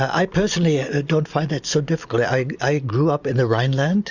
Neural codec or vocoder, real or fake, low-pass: none; real; 7.2 kHz